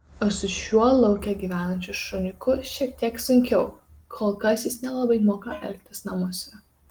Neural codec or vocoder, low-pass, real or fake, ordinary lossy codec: none; 19.8 kHz; real; Opus, 24 kbps